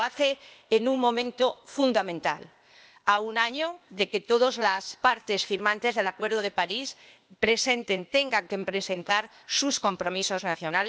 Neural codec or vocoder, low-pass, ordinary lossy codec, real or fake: codec, 16 kHz, 0.8 kbps, ZipCodec; none; none; fake